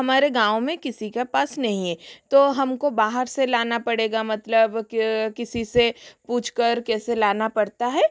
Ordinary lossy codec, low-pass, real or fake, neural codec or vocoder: none; none; real; none